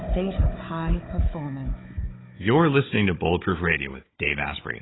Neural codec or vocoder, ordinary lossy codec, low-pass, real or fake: codec, 16 kHz, 16 kbps, FunCodec, trained on Chinese and English, 50 frames a second; AAC, 16 kbps; 7.2 kHz; fake